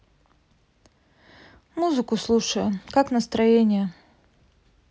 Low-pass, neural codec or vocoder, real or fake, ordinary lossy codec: none; none; real; none